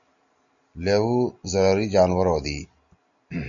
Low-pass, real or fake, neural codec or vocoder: 7.2 kHz; real; none